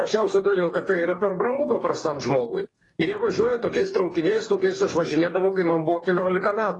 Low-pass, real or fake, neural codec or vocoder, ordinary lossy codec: 10.8 kHz; fake; codec, 44.1 kHz, 2.6 kbps, DAC; AAC, 32 kbps